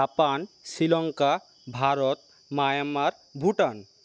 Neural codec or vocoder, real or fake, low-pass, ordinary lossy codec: none; real; none; none